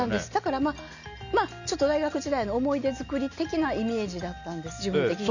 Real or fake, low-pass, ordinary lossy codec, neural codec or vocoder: real; 7.2 kHz; none; none